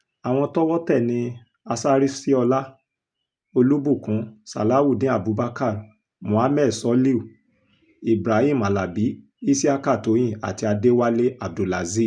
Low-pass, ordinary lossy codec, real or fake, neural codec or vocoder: 9.9 kHz; none; real; none